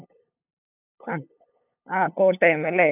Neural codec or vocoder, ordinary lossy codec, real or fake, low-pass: codec, 16 kHz, 8 kbps, FunCodec, trained on LibriTTS, 25 frames a second; none; fake; 3.6 kHz